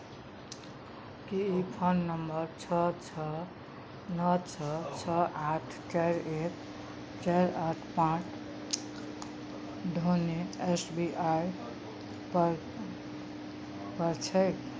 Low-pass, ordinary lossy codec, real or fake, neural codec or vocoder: none; none; real; none